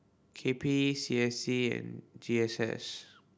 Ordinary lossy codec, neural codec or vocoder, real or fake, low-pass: none; none; real; none